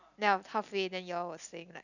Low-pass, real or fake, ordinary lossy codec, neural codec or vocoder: 7.2 kHz; real; none; none